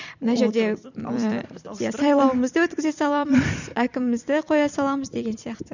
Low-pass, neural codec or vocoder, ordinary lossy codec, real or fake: 7.2 kHz; vocoder, 44.1 kHz, 128 mel bands every 256 samples, BigVGAN v2; none; fake